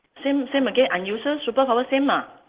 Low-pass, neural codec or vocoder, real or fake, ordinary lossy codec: 3.6 kHz; none; real; Opus, 16 kbps